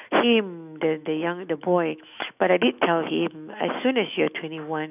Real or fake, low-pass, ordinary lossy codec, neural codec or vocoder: fake; 3.6 kHz; none; autoencoder, 48 kHz, 128 numbers a frame, DAC-VAE, trained on Japanese speech